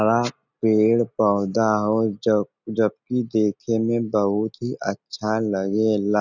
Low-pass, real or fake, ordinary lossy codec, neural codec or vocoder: 7.2 kHz; real; none; none